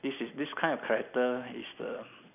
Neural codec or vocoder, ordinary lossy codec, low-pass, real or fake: none; none; 3.6 kHz; real